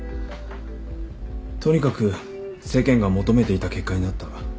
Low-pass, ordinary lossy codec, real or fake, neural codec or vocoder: none; none; real; none